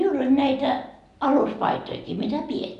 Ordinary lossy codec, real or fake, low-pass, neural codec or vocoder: none; real; 10.8 kHz; none